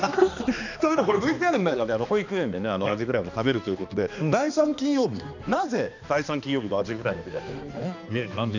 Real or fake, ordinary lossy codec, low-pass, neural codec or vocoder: fake; none; 7.2 kHz; codec, 16 kHz, 2 kbps, X-Codec, HuBERT features, trained on balanced general audio